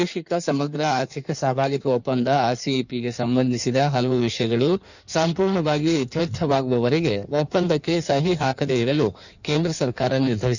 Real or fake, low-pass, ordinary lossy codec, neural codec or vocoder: fake; 7.2 kHz; none; codec, 16 kHz in and 24 kHz out, 1.1 kbps, FireRedTTS-2 codec